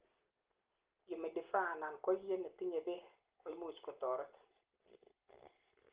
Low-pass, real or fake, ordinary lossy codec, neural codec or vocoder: 3.6 kHz; real; Opus, 16 kbps; none